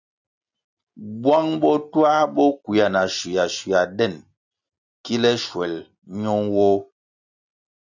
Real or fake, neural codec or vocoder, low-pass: real; none; 7.2 kHz